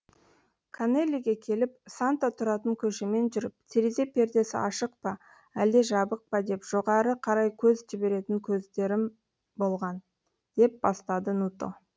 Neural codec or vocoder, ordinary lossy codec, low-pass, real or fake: none; none; none; real